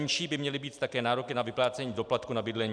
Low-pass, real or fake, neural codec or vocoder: 9.9 kHz; real; none